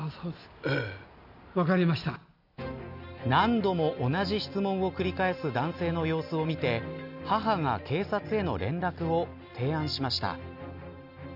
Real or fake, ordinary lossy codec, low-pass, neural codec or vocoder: real; none; 5.4 kHz; none